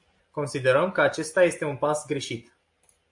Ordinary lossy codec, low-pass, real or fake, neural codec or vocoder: AAC, 64 kbps; 10.8 kHz; real; none